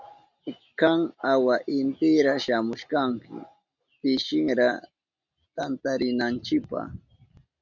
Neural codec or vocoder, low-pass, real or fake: none; 7.2 kHz; real